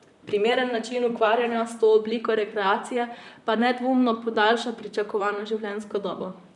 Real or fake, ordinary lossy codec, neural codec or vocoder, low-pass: fake; none; vocoder, 44.1 kHz, 128 mel bands, Pupu-Vocoder; 10.8 kHz